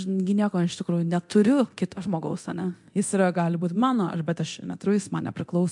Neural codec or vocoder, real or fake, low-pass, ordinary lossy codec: codec, 24 kHz, 0.9 kbps, DualCodec; fake; 10.8 kHz; MP3, 64 kbps